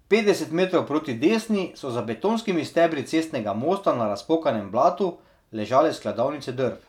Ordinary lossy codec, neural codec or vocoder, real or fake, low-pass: none; none; real; 19.8 kHz